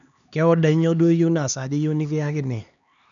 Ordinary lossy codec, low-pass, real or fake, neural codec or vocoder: none; 7.2 kHz; fake; codec, 16 kHz, 2 kbps, X-Codec, HuBERT features, trained on LibriSpeech